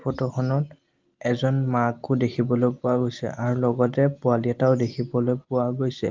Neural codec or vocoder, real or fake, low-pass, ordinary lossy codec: none; real; 7.2 kHz; Opus, 32 kbps